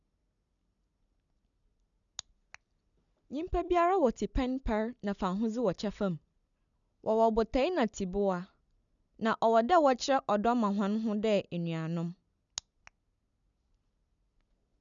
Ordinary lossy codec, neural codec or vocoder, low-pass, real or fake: none; none; 7.2 kHz; real